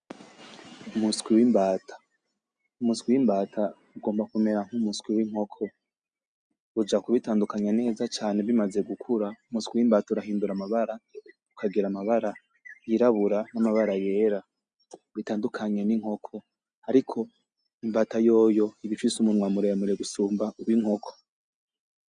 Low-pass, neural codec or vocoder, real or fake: 9.9 kHz; none; real